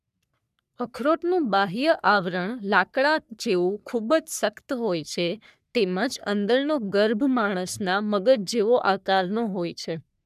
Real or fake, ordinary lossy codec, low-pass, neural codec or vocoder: fake; none; 14.4 kHz; codec, 44.1 kHz, 3.4 kbps, Pupu-Codec